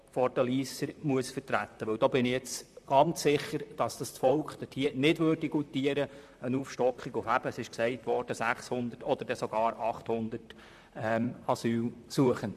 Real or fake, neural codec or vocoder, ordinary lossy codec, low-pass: fake; vocoder, 44.1 kHz, 128 mel bands, Pupu-Vocoder; AAC, 96 kbps; 14.4 kHz